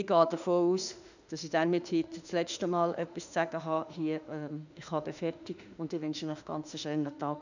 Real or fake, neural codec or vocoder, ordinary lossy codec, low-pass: fake; autoencoder, 48 kHz, 32 numbers a frame, DAC-VAE, trained on Japanese speech; none; 7.2 kHz